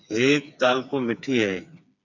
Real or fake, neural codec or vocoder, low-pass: fake; codec, 16 kHz, 4 kbps, FreqCodec, smaller model; 7.2 kHz